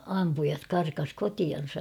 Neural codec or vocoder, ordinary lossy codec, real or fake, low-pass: none; none; real; 19.8 kHz